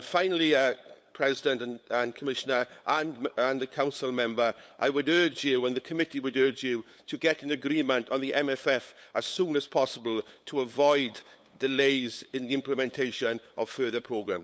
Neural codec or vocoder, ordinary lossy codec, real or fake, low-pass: codec, 16 kHz, 8 kbps, FunCodec, trained on LibriTTS, 25 frames a second; none; fake; none